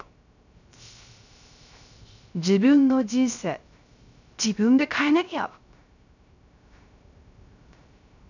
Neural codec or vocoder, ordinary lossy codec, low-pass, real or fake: codec, 16 kHz, 0.3 kbps, FocalCodec; none; 7.2 kHz; fake